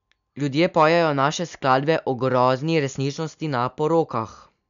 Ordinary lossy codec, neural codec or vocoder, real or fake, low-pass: none; none; real; 7.2 kHz